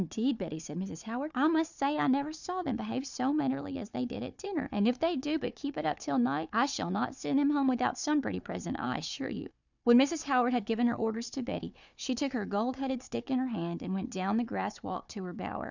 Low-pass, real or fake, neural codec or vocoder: 7.2 kHz; fake; codec, 16 kHz, 4 kbps, FunCodec, trained on Chinese and English, 50 frames a second